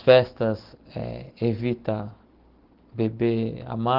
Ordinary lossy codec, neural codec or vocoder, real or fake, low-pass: Opus, 16 kbps; none; real; 5.4 kHz